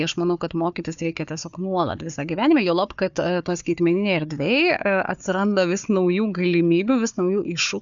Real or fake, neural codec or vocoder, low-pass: fake; codec, 16 kHz, 4 kbps, FunCodec, trained on Chinese and English, 50 frames a second; 7.2 kHz